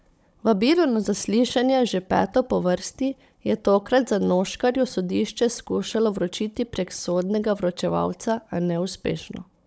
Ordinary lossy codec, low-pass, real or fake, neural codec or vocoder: none; none; fake; codec, 16 kHz, 16 kbps, FunCodec, trained on Chinese and English, 50 frames a second